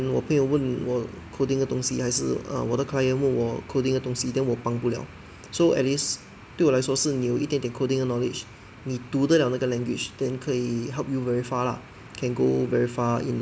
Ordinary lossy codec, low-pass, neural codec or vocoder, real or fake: none; none; none; real